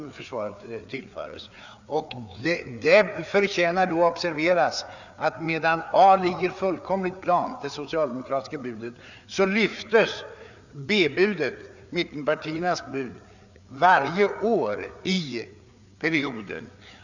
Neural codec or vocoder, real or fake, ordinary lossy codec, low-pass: codec, 16 kHz, 4 kbps, FreqCodec, larger model; fake; none; 7.2 kHz